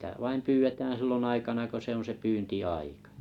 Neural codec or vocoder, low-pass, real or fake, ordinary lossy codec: none; 19.8 kHz; real; none